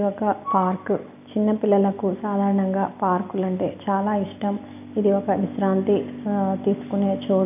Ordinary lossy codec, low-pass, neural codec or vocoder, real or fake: none; 3.6 kHz; none; real